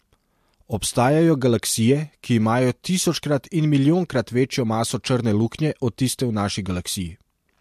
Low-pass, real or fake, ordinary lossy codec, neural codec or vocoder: 14.4 kHz; real; MP3, 64 kbps; none